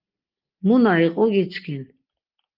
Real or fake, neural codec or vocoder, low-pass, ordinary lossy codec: real; none; 5.4 kHz; Opus, 32 kbps